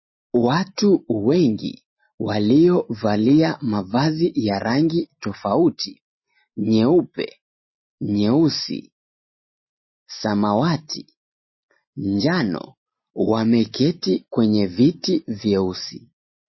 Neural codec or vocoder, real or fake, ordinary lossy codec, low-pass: none; real; MP3, 24 kbps; 7.2 kHz